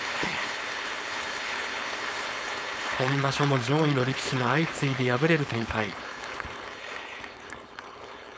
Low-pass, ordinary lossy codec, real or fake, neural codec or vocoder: none; none; fake; codec, 16 kHz, 4.8 kbps, FACodec